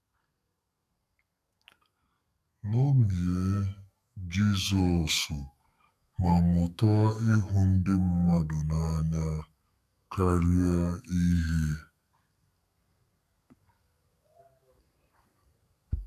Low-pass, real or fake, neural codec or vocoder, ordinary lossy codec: 14.4 kHz; fake; codec, 32 kHz, 1.9 kbps, SNAC; none